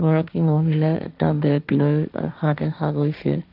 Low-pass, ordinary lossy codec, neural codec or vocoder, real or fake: 5.4 kHz; none; codec, 16 kHz, 1.1 kbps, Voila-Tokenizer; fake